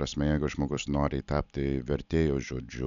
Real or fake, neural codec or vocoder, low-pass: real; none; 7.2 kHz